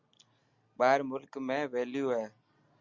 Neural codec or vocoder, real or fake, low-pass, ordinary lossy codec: none; real; 7.2 kHz; Opus, 64 kbps